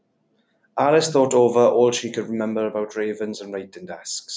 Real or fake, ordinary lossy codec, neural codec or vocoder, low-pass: real; none; none; none